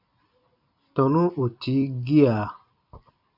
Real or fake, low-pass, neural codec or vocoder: fake; 5.4 kHz; vocoder, 44.1 kHz, 128 mel bands every 256 samples, BigVGAN v2